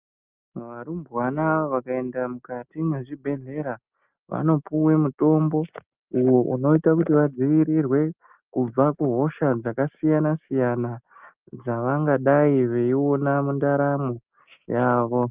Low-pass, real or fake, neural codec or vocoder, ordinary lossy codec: 3.6 kHz; real; none; Opus, 32 kbps